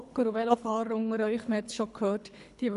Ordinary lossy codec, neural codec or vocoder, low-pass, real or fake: none; codec, 24 kHz, 3 kbps, HILCodec; 10.8 kHz; fake